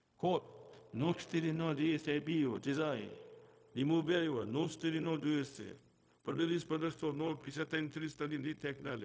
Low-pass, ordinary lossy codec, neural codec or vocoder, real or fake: none; none; codec, 16 kHz, 0.4 kbps, LongCat-Audio-Codec; fake